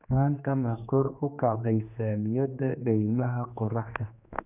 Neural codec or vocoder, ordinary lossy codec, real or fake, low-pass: codec, 16 kHz, 2 kbps, X-Codec, HuBERT features, trained on general audio; none; fake; 3.6 kHz